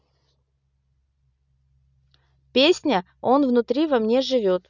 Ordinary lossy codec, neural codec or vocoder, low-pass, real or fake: none; none; 7.2 kHz; real